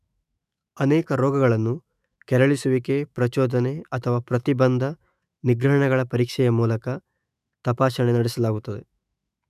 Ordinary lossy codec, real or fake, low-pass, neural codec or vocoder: none; fake; 14.4 kHz; autoencoder, 48 kHz, 128 numbers a frame, DAC-VAE, trained on Japanese speech